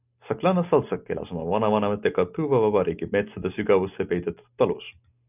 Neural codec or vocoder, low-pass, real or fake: none; 3.6 kHz; real